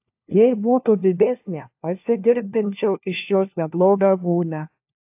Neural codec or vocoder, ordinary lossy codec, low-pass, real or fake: codec, 16 kHz, 1 kbps, FunCodec, trained on LibriTTS, 50 frames a second; AAC, 32 kbps; 3.6 kHz; fake